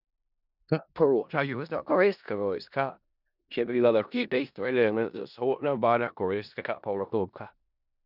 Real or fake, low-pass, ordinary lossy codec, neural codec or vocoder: fake; 5.4 kHz; none; codec, 16 kHz in and 24 kHz out, 0.4 kbps, LongCat-Audio-Codec, four codebook decoder